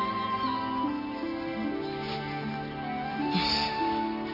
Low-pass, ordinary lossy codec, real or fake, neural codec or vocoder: 5.4 kHz; none; real; none